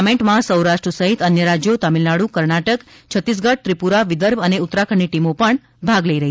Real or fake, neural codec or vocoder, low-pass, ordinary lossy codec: real; none; none; none